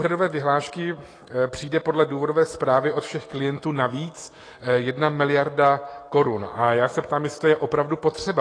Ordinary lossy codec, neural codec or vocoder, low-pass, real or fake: AAC, 32 kbps; autoencoder, 48 kHz, 128 numbers a frame, DAC-VAE, trained on Japanese speech; 9.9 kHz; fake